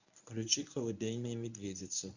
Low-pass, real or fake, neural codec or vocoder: 7.2 kHz; fake; codec, 24 kHz, 0.9 kbps, WavTokenizer, medium speech release version 1